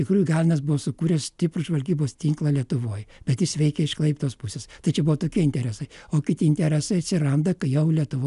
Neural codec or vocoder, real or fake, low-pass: none; real; 10.8 kHz